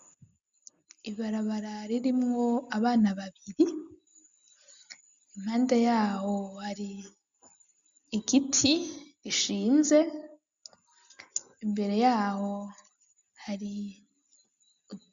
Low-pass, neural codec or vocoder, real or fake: 7.2 kHz; none; real